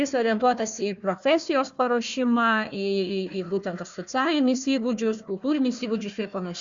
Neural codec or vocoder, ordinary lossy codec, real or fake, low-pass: codec, 16 kHz, 1 kbps, FunCodec, trained on Chinese and English, 50 frames a second; Opus, 64 kbps; fake; 7.2 kHz